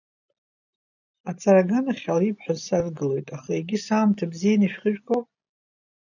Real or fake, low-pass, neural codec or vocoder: real; 7.2 kHz; none